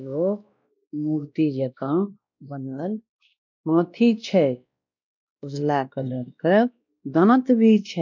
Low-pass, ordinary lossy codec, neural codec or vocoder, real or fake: 7.2 kHz; none; codec, 16 kHz, 1 kbps, X-Codec, WavLM features, trained on Multilingual LibriSpeech; fake